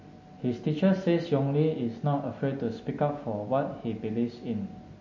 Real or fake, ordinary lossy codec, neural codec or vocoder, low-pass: real; MP3, 32 kbps; none; 7.2 kHz